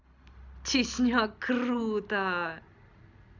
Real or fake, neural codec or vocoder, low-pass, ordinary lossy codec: real; none; 7.2 kHz; none